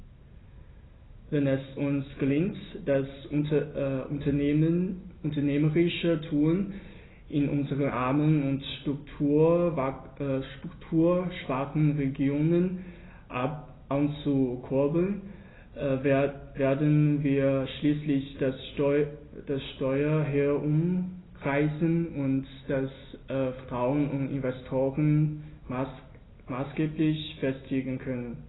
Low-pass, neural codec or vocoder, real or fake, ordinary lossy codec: 7.2 kHz; none; real; AAC, 16 kbps